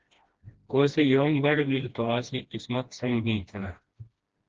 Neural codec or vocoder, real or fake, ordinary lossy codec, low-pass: codec, 16 kHz, 1 kbps, FreqCodec, smaller model; fake; Opus, 16 kbps; 7.2 kHz